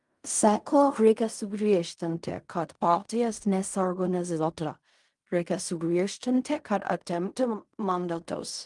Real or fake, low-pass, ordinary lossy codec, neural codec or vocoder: fake; 10.8 kHz; Opus, 32 kbps; codec, 16 kHz in and 24 kHz out, 0.4 kbps, LongCat-Audio-Codec, fine tuned four codebook decoder